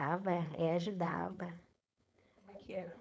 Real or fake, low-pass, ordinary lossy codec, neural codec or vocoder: fake; none; none; codec, 16 kHz, 4.8 kbps, FACodec